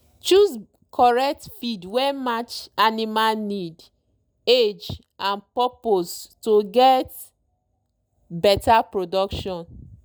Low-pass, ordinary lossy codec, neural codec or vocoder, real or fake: none; none; none; real